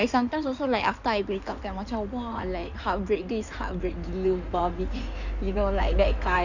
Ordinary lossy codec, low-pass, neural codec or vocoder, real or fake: none; 7.2 kHz; codec, 16 kHz in and 24 kHz out, 2.2 kbps, FireRedTTS-2 codec; fake